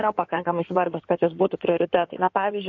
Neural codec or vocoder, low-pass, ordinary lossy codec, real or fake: codec, 16 kHz in and 24 kHz out, 2.2 kbps, FireRedTTS-2 codec; 7.2 kHz; AAC, 48 kbps; fake